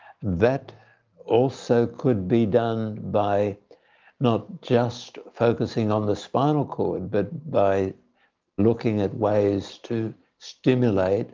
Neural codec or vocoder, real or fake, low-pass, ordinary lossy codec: none; real; 7.2 kHz; Opus, 24 kbps